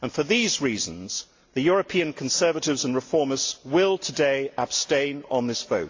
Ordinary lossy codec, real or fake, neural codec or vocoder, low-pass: AAC, 48 kbps; real; none; 7.2 kHz